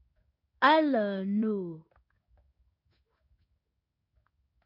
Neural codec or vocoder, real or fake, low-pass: codec, 16 kHz in and 24 kHz out, 1 kbps, XY-Tokenizer; fake; 5.4 kHz